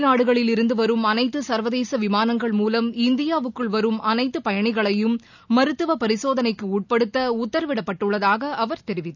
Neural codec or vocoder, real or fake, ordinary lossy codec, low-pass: none; real; none; 7.2 kHz